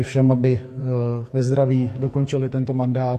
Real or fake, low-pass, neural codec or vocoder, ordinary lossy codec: fake; 14.4 kHz; codec, 44.1 kHz, 2.6 kbps, SNAC; AAC, 64 kbps